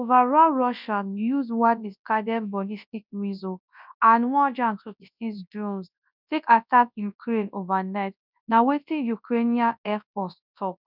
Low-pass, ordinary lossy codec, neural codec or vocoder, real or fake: 5.4 kHz; none; codec, 24 kHz, 0.9 kbps, WavTokenizer, large speech release; fake